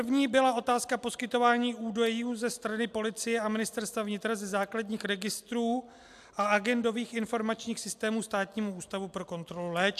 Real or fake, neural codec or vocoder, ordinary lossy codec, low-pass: real; none; AAC, 96 kbps; 14.4 kHz